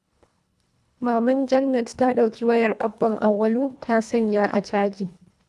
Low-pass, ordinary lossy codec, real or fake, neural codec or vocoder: none; none; fake; codec, 24 kHz, 1.5 kbps, HILCodec